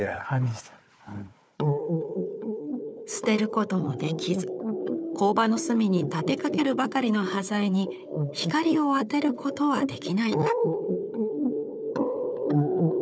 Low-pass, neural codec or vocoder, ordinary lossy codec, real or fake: none; codec, 16 kHz, 4 kbps, FunCodec, trained on Chinese and English, 50 frames a second; none; fake